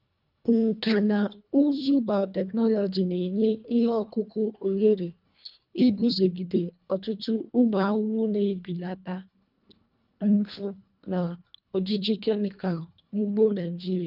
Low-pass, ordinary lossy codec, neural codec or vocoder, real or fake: 5.4 kHz; none; codec, 24 kHz, 1.5 kbps, HILCodec; fake